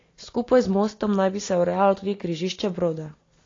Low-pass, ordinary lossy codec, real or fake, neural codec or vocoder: 7.2 kHz; AAC, 32 kbps; real; none